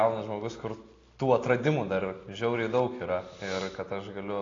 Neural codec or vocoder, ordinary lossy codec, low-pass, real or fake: none; AAC, 32 kbps; 7.2 kHz; real